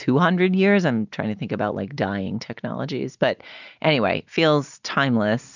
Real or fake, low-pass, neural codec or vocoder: real; 7.2 kHz; none